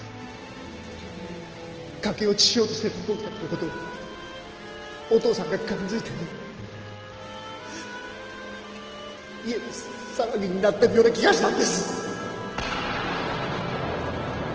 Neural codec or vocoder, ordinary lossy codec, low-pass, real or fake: none; Opus, 16 kbps; 7.2 kHz; real